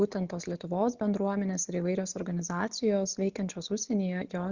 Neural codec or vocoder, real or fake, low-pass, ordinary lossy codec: none; real; 7.2 kHz; Opus, 64 kbps